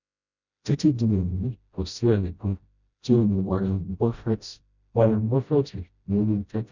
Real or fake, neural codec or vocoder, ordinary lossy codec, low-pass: fake; codec, 16 kHz, 0.5 kbps, FreqCodec, smaller model; none; 7.2 kHz